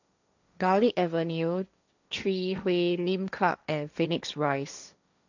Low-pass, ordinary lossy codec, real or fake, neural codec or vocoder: 7.2 kHz; none; fake; codec, 16 kHz, 1.1 kbps, Voila-Tokenizer